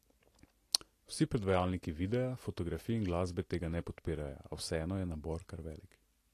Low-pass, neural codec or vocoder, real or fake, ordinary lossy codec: 14.4 kHz; none; real; AAC, 48 kbps